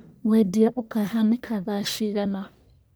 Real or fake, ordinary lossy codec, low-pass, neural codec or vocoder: fake; none; none; codec, 44.1 kHz, 1.7 kbps, Pupu-Codec